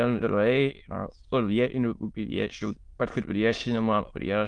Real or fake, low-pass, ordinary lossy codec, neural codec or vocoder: fake; 9.9 kHz; Opus, 24 kbps; autoencoder, 22.05 kHz, a latent of 192 numbers a frame, VITS, trained on many speakers